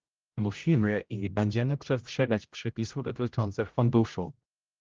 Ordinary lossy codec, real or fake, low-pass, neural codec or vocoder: Opus, 24 kbps; fake; 7.2 kHz; codec, 16 kHz, 0.5 kbps, X-Codec, HuBERT features, trained on general audio